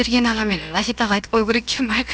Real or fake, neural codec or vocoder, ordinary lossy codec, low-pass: fake; codec, 16 kHz, about 1 kbps, DyCAST, with the encoder's durations; none; none